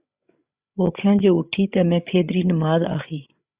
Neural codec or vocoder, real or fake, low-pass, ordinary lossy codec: codec, 16 kHz, 8 kbps, FreqCodec, larger model; fake; 3.6 kHz; Opus, 64 kbps